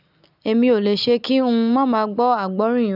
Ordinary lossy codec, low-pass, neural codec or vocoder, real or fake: none; 5.4 kHz; none; real